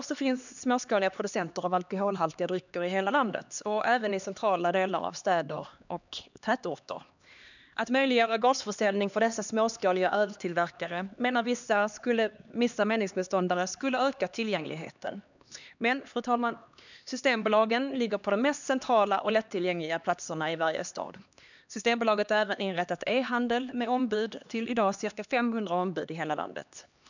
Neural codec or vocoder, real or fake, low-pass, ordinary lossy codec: codec, 16 kHz, 2 kbps, X-Codec, HuBERT features, trained on LibriSpeech; fake; 7.2 kHz; none